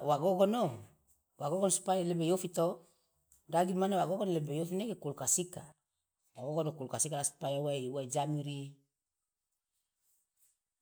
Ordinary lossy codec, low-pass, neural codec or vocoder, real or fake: none; none; none; real